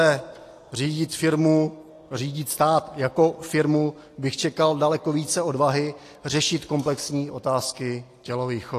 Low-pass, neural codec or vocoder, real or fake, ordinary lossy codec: 14.4 kHz; none; real; AAC, 48 kbps